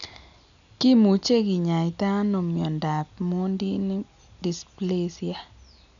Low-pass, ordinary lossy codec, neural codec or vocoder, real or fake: 7.2 kHz; none; none; real